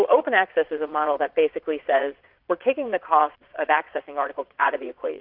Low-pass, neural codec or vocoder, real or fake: 5.4 kHz; vocoder, 22.05 kHz, 80 mel bands, Vocos; fake